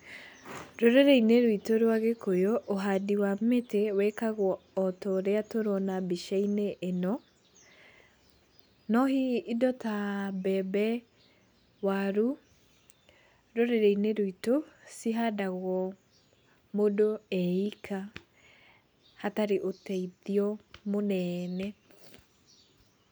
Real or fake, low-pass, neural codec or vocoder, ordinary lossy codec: real; none; none; none